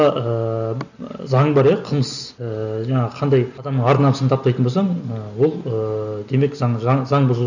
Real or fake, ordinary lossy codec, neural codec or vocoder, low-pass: real; none; none; 7.2 kHz